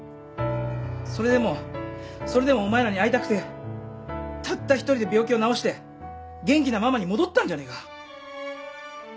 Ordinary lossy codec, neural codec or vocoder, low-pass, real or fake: none; none; none; real